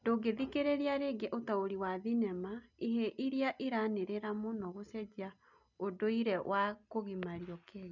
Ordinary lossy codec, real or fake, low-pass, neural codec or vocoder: none; real; 7.2 kHz; none